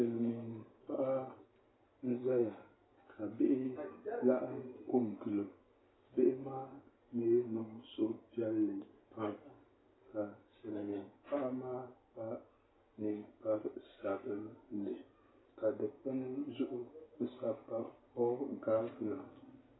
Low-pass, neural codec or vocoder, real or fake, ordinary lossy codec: 7.2 kHz; none; real; AAC, 16 kbps